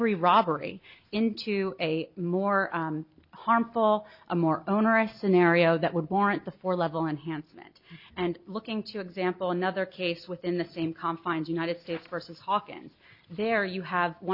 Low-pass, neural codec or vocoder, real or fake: 5.4 kHz; none; real